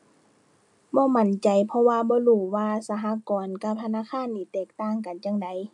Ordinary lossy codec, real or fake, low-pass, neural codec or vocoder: AAC, 64 kbps; real; 10.8 kHz; none